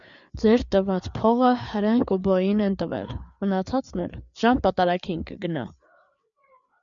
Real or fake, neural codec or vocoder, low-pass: fake; codec, 16 kHz, 4 kbps, FreqCodec, larger model; 7.2 kHz